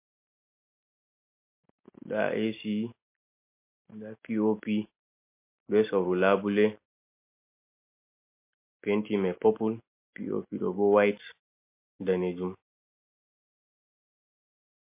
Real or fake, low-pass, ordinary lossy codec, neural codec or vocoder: real; 3.6 kHz; MP3, 24 kbps; none